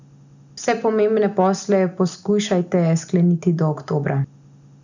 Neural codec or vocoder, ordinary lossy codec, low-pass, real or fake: none; none; 7.2 kHz; real